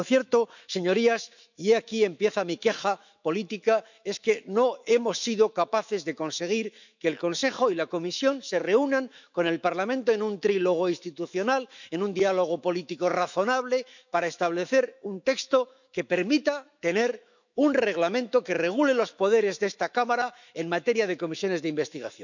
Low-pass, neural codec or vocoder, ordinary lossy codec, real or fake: 7.2 kHz; autoencoder, 48 kHz, 128 numbers a frame, DAC-VAE, trained on Japanese speech; none; fake